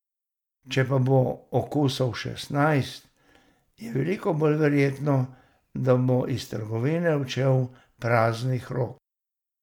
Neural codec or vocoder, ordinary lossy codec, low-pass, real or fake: none; MP3, 96 kbps; 19.8 kHz; real